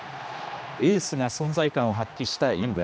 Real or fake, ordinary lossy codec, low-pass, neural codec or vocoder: fake; none; none; codec, 16 kHz, 2 kbps, X-Codec, HuBERT features, trained on general audio